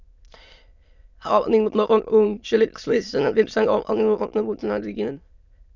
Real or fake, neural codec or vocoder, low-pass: fake; autoencoder, 22.05 kHz, a latent of 192 numbers a frame, VITS, trained on many speakers; 7.2 kHz